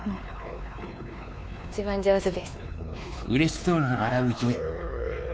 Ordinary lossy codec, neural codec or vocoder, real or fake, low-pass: none; codec, 16 kHz, 2 kbps, X-Codec, WavLM features, trained on Multilingual LibriSpeech; fake; none